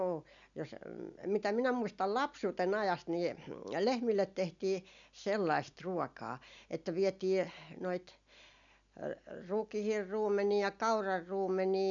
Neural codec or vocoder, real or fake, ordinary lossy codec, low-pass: none; real; none; 7.2 kHz